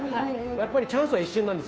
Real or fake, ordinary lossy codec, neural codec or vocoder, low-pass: fake; none; codec, 16 kHz, 2 kbps, FunCodec, trained on Chinese and English, 25 frames a second; none